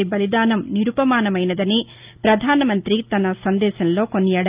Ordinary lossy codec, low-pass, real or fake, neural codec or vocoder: Opus, 24 kbps; 3.6 kHz; real; none